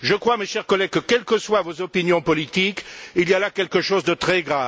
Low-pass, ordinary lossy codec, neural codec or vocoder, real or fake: none; none; none; real